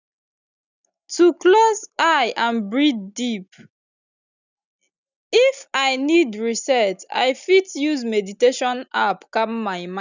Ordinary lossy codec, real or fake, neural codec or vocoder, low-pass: none; real; none; 7.2 kHz